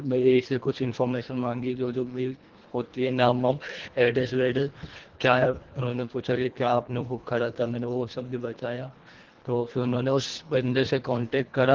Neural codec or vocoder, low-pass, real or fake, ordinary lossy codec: codec, 24 kHz, 1.5 kbps, HILCodec; 7.2 kHz; fake; Opus, 16 kbps